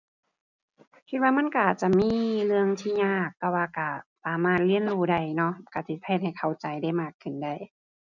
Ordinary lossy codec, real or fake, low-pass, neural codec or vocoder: none; real; 7.2 kHz; none